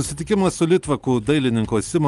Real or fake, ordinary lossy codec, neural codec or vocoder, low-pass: real; Opus, 32 kbps; none; 10.8 kHz